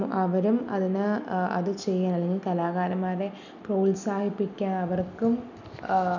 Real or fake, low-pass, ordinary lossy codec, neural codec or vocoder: real; 7.2 kHz; none; none